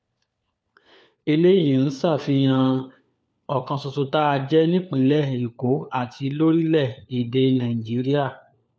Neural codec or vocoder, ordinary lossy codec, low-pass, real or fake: codec, 16 kHz, 4 kbps, FunCodec, trained on LibriTTS, 50 frames a second; none; none; fake